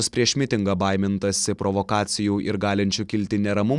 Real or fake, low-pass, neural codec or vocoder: real; 10.8 kHz; none